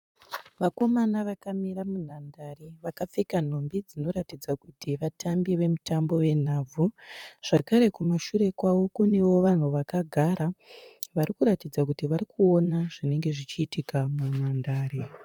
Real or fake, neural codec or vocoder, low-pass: fake; vocoder, 44.1 kHz, 128 mel bands, Pupu-Vocoder; 19.8 kHz